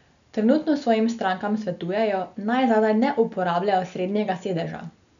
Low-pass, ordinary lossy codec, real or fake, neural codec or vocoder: 7.2 kHz; none; real; none